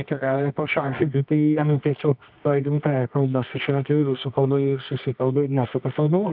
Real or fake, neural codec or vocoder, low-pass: fake; codec, 24 kHz, 0.9 kbps, WavTokenizer, medium music audio release; 5.4 kHz